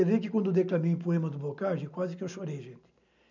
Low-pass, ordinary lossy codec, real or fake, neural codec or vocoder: 7.2 kHz; none; real; none